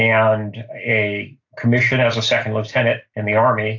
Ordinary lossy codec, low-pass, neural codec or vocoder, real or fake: AAC, 48 kbps; 7.2 kHz; none; real